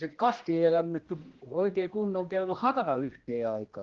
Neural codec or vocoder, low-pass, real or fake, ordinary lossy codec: codec, 16 kHz, 1 kbps, X-Codec, HuBERT features, trained on general audio; 7.2 kHz; fake; Opus, 16 kbps